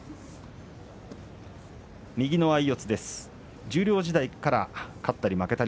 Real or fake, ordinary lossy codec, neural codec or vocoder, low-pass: real; none; none; none